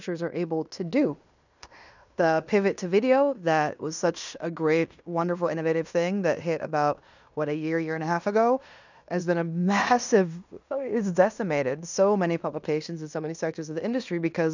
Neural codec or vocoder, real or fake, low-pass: codec, 16 kHz in and 24 kHz out, 0.9 kbps, LongCat-Audio-Codec, fine tuned four codebook decoder; fake; 7.2 kHz